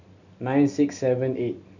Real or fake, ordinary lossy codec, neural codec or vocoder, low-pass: real; none; none; 7.2 kHz